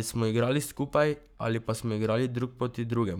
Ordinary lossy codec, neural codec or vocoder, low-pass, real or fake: none; codec, 44.1 kHz, 7.8 kbps, Pupu-Codec; none; fake